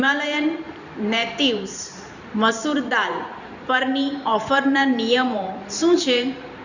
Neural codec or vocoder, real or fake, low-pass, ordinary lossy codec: vocoder, 44.1 kHz, 128 mel bands every 512 samples, BigVGAN v2; fake; 7.2 kHz; none